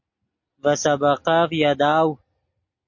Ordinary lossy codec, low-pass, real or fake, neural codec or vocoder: MP3, 48 kbps; 7.2 kHz; real; none